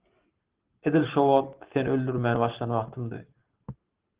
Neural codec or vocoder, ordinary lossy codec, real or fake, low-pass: none; Opus, 16 kbps; real; 3.6 kHz